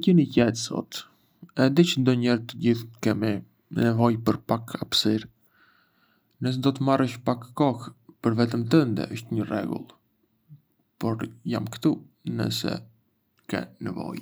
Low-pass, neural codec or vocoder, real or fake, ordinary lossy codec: none; none; real; none